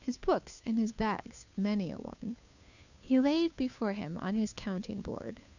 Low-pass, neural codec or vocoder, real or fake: 7.2 kHz; codec, 16 kHz, 2 kbps, FunCodec, trained on Chinese and English, 25 frames a second; fake